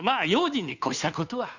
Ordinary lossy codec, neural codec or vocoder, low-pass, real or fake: none; codec, 16 kHz, 2 kbps, FunCodec, trained on Chinese and English, 25 frames a second; 7.2 kHz; fake